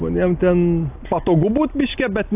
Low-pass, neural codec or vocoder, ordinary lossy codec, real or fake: 3.6 kHz; none; AAC, 32 kbps; real